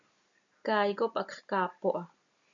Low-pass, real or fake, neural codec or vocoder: 7.2 kHz; real; none